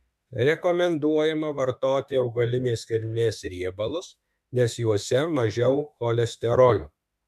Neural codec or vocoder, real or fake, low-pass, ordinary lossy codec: autoencoder, 48 kHz, 32 numbers a frame, DAC-VAE, trained on Japanese speech; fake; 14.4 kHz; MP3, 96 kbps